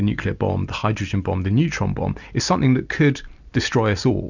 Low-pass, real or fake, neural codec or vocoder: 7.2 kHz; real; none